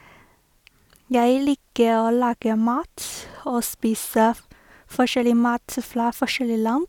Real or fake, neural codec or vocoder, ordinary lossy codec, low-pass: real; none; none; 19.8 kHz